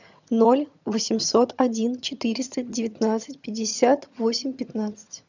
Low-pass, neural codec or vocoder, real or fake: 7.2 kHz; vocoder, 22.05 kHz, 80 mel bands, HiFi-GAN; fake